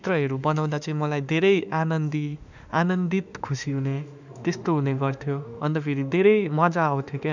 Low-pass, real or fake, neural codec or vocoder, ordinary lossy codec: 7.2 kHz; fake; autoencoder, 48 kHz, 32 numbers a frame, DAC-VAE, trained on Japanese speech; none